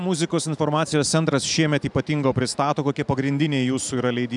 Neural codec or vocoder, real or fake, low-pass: vocoder, 44.1 kHz, 128 mel bands every 512 samples, BigVGAN v2; fake; 10.8 kHz